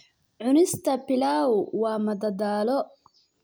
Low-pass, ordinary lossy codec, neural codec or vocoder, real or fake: none; none; none; real